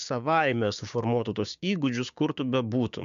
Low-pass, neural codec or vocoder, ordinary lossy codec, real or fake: 7.2 kHz; codec, 16 kHz, 6 kbps, DAC; MP3, 64 kbps; fake